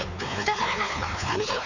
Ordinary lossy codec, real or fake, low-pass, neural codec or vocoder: none; fake; 7.2 kHz; codec, 16 kHz, 4 kbps, X-Codec, WavLM features, trained on Multilingual LibriSpeech